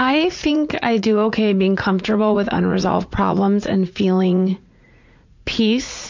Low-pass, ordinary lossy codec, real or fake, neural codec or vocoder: 7.2 kHz; MP3, 64 kbps; fake; vocoder, 44.1 kHz, 80 mel bands, Vocos